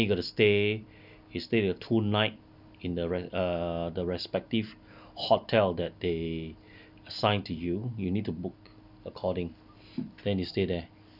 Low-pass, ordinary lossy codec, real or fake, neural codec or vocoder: 5.4 kHz; none; real; none